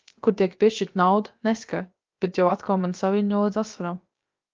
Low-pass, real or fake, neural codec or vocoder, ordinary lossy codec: 7.2 kHz; fake; codec, 16 kHz, about 1 kbps, DyCAST, with the encoder's durations; Opus, 24 kbps